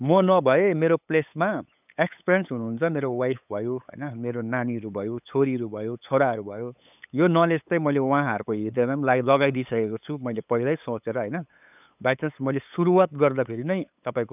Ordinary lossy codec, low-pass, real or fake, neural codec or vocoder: none; 3.6 kHz; fake; codec, 16 kHz, 8 kbps, FunCodec, trained on LibriTTS, 25 frames a second